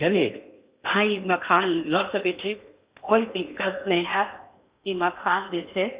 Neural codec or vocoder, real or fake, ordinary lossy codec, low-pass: codec, 16 kHz in and 24 kHz out, 0.8 kbps, FocalCodec, streaming, 65536 codes; fake; Opus, 64 kbps; 3.6 kHz